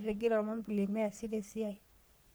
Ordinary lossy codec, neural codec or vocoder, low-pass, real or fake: none; codec, 44.1 kHz, 3.4 kbps, Pupu-Codec; none; fake